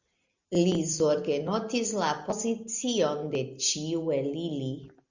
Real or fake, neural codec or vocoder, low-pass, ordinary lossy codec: real; none; 7.2 kHz; Opus, 64 kbps